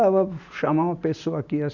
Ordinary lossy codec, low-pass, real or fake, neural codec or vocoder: none; 7.2 kHz; real; none